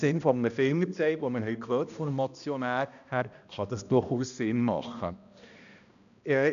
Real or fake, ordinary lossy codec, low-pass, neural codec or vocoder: fake; none; 7.2 kHz; codec, 16 kHz, 1 kbps, X-Codec, HuBERT features, trained on balanced general audio